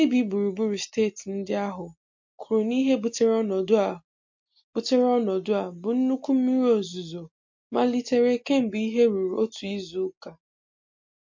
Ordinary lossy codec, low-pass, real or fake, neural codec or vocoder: MP3, 48 kbps; 7.2 kHz; real; none